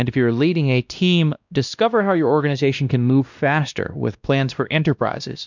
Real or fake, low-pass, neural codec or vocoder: fake; 7.2 kHz; codec, 16 kHz, 1 kbps, X-Codec, WavLM features, trained on Multilingual LibriSpeech